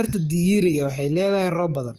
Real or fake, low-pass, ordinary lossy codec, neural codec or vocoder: fake; 14.4 kHz; Opus, 32 kbps; codec, 44.1 kHz, 7.8 kbps, DAC